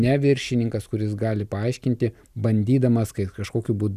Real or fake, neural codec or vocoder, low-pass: real; none; 14.4 kHz